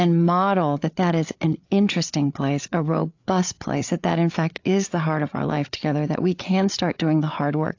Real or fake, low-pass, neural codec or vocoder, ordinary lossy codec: fake; 7.2 kHz; codec, 16 kHz, 8 kbps, FreqCodec, larger model; AAC, 48 kbps